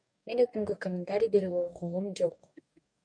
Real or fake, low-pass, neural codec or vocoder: fake; 9.9 kHz; codec, 44.1 kHz, 2.6 kbps, DAC